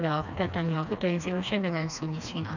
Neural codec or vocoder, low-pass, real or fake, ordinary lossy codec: codec, 16 kHz, 2 kbps, FreqCodec, smaller model; 7.2 kHz; fake; none